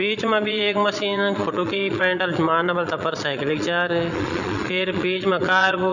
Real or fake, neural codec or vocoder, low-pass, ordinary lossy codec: fake; vocoder, 22.05 kHz, 80 mel bands, Vocos; 7.2 kHz; none